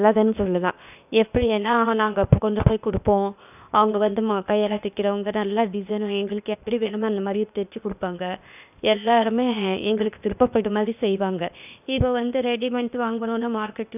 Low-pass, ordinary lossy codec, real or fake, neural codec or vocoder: 3.6 kHz; none; fake; codec, 16 kHz, 0.8 kbps, ZipCodec